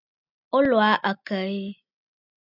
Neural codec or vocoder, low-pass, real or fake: none; 5.4 kHz; real